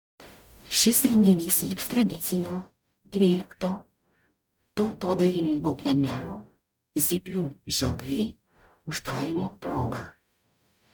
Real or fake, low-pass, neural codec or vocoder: fake; 19.8 kHz; codec, 44.1 kHz, 0.9 kbps, DAC